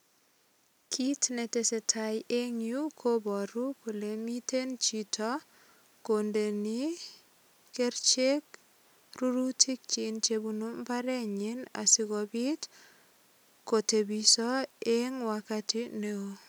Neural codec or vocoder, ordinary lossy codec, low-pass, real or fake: none; none; none; real